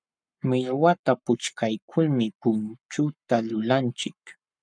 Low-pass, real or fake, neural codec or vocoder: 9.9 kHz; fake; codec, 44.1 kHz, 7.8 kbps, Pupu-Codec